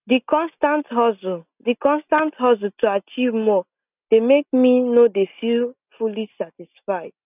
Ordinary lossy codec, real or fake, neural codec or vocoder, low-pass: none; real; none; 3.6 kHz